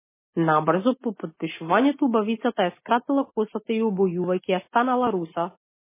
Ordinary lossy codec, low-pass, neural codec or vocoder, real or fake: MP3, 16 kbps; 3.6 kHz; none; real